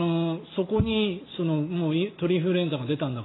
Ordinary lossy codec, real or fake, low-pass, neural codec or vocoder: AAC, 16 kbps; real; 7.2 kHz; none